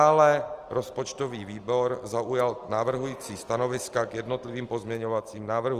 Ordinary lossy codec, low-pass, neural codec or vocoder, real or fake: Opus, 24 kbps; 14.4 kHz; none; real